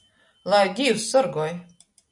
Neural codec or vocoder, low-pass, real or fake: none; 10.8 kHz; real